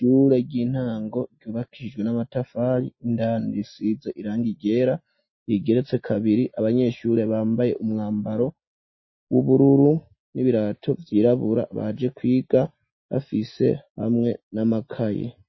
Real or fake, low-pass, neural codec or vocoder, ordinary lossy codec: real; 7.2 kHz; none; MP3, 24 kbps